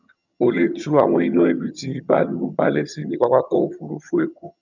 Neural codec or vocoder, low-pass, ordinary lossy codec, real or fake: vocoder, 22.05 kHz, 80 mel bands, HiFi-GAN; 7.2 kHz; none; fake